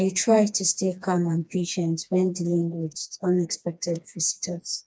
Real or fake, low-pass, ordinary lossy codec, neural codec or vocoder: fake; none; none; codec, 16 kHz, 2 kbps, FreqCodec, smaller model